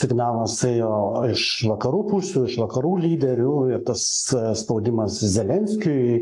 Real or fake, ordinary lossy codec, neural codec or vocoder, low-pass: fake; AAC, 64 kbps; codec, 44.1 kHz, 7.8 kbps, Pupu-Codec; 10.8 kHz